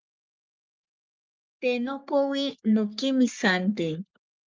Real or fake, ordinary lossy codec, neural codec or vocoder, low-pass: fake; Opus, 24 kbps; codec, 44.1 kHz, 3.4 kbps, Pupu-Codec; 7.2 kHz